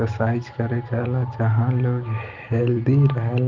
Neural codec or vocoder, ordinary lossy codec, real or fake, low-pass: none; Opus, 32 kbps; real; 7.2 kHz